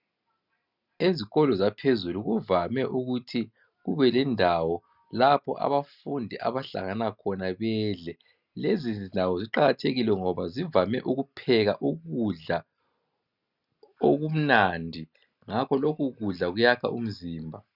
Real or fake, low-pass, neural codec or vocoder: real; 5.4 kHz; none